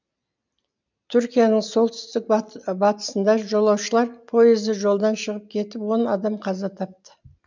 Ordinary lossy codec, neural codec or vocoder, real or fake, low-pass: none; none; real; 7.2 kHz